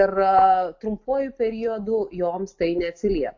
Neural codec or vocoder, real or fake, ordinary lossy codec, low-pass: none; real; AAC, 48 kbps; 7.2 kHz